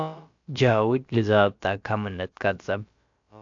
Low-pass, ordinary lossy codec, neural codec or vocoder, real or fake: 7.2 kHz; AAC, 64 kbps; codec, 16 kHz, about 1 kbps, DyCAST, with the encoder's durations; fake